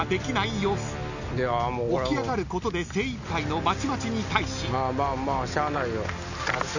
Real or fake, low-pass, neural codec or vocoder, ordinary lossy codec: real; 7.2 kHz; none; none